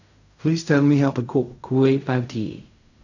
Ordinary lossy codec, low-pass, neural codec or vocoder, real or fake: none; 7.2 kHz; codec, 16 kHz in and 24 kHz out, 0.4 kbps, LongCat-Audio-Codec, fine tuned four codebook decoder; fake